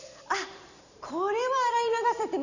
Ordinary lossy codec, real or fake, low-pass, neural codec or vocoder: none; fake; 7.2 kHz; vocoder, 44.1 kHz, 128 mel bands every 512 samples, BigVGAN v2